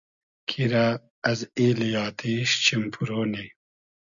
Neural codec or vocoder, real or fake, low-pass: none; real; 7.2 kHz